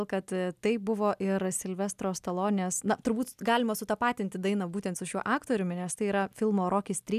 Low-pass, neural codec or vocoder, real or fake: 14.4 kHz; none; real